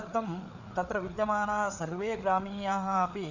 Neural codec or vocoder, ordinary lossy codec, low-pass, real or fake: codec, 16 kHz, 4 kbps, FreqCodec, larger model; none; 7.2 kHz; fake